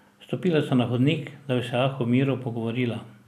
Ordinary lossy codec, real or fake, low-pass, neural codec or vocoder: none; real; 14.4 kHz; none